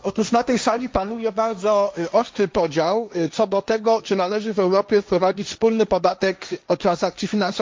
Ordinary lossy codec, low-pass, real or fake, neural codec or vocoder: none; none; fake; codec, 16 kHz, 1.1 kbps, Voila-Tokenizer